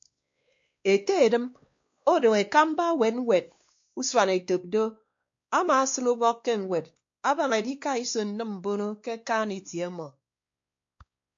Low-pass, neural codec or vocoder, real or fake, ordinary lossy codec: 7.2 kHz; codec, 16 kHz, 2 kbps, X-Codec, WavLM features, trained on Multilingual LibriSpeech; fake; MP3, 48 kbps